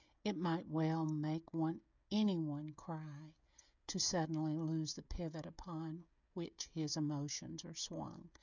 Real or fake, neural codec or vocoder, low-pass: fake; codec, 16 kHz, 16 kbps, FreqCodec, smaller model; 7.2 kHz